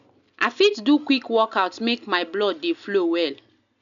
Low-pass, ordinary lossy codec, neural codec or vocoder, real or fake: 7.2 kHz; none; none; real